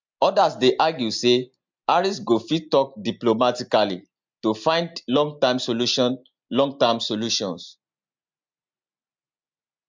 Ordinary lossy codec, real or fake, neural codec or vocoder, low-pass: MP3, 64 kbps; real; none; 7.2 kHz